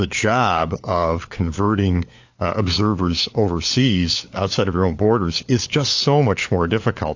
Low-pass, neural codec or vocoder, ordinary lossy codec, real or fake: 7.2 kHz; codec, 16 kHz, 4 kbps, FunCodec, trained on LibriTTS, 50 frames a second; AAC, 48 kbps; fake